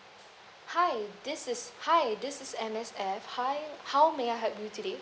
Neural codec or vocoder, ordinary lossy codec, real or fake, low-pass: none; none; real; none